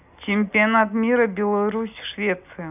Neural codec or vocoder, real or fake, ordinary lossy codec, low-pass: none; real; none; 3.6 kHz